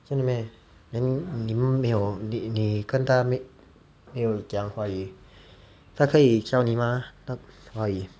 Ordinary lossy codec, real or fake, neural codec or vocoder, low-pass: none; real; none; none